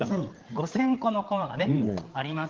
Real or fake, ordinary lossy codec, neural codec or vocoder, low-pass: fake; Opus, 16 kbps; codec, 16 kHz, 8 kbps, FreqCodec, larger model; 7.2 kHz